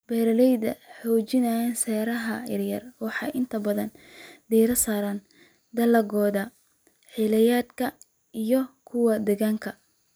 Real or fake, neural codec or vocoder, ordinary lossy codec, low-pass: real; none; none; none